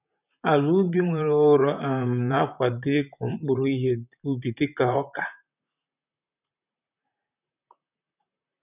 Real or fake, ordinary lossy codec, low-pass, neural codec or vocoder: fake; none; 3.6 kHz; vocoder, 44.1 kHz, 128 mel bands, Pupu-Vocoder